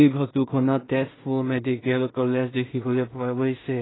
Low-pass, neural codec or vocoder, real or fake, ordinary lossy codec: 7.2 kHz; codec, 16 kHz in and 24 kHz out, 0.4 kbps, LongCat-Audio-Codec, two codebook decoder; fake; AAC, 16 kbps